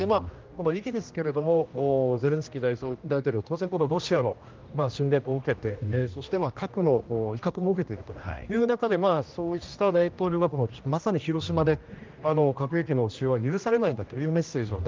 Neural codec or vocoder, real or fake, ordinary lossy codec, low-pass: codec, 16 kHz, 1 kbps, X-Codec, HuBERT features, trained on general audio; fake; Opus, 24 kbps; 7.2 kHz